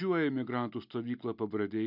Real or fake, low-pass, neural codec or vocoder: real; 5.4 kHz; none